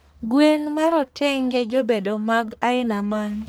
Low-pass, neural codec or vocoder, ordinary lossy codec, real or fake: none; codec, 44.1 kHz, 1.7 kbps, Pupu-Codec; none; fake